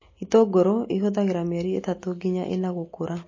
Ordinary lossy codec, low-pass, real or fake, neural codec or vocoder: MP3, 32 kbps; 7.2 kHz; real; none